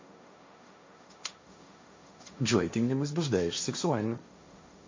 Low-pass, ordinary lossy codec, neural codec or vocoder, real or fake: 7.2 kHz; MP3, 48 kbps; codec, 16 kHz, 1.1 kbps, Voila-Tokenizer; fake